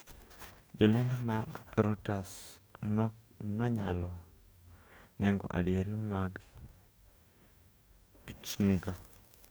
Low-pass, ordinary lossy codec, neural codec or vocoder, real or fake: none; none; codec, 44.1 kHz, 2.6 kbps, DAC; fake